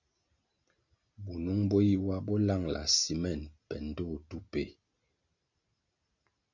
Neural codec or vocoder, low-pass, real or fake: none; 7.2 kHz; real